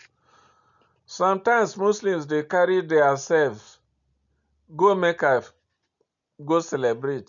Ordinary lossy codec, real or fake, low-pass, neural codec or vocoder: none; real; 7.2 kHz; none